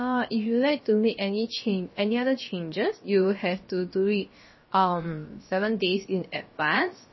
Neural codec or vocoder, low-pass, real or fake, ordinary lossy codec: codec, 16 kHz, about 1 kbps, DyCAST, with the encoder's durations; 7.2 kHz; fake; MP3, 24 kbps